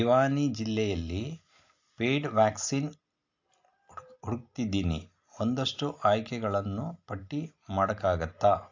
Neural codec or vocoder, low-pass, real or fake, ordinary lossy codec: none; 7.2 kHz; real; AAC, 48 kbps